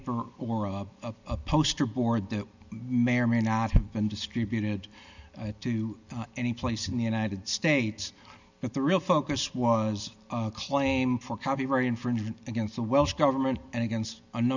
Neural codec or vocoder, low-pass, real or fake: none; 7.2 kHz; real